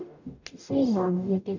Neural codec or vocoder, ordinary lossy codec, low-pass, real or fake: codec, 44.1 kHz, 0.9 kbps, DAC; none; 7.2 kHz; fake